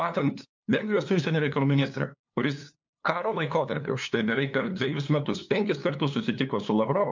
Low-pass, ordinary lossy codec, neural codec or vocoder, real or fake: 7.2 kHz; MP3, 64 kbps; codec, 16 kHz, 2 kbps, FunCodec, trained on LibriTTS, 25 frames a second; fake